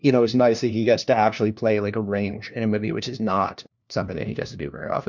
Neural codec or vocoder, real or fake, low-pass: codec, 16 kHz, 1 kbps, FunCodec, trained on LibriTTS, 50 frames a second; fake; 7.2 kHz